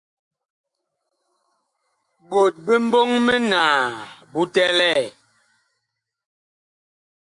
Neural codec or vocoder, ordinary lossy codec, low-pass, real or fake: autoencoder, 48 kHz, 128 numbers a frame, DAC-VAE, trained on Japanese speech; Opus, 64 kbps; 10.8 kHz; fake